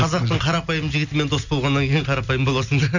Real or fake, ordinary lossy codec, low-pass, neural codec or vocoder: real; none; 7.2 kHz; none